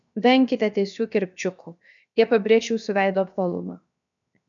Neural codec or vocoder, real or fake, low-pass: codec, 16 kHz, 0.7 kbps, FocalCodec; fake; 7.2 kHz